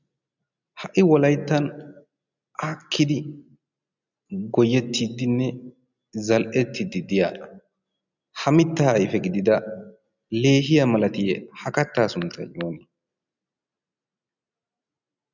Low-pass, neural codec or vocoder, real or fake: 7.2 kHz; none; real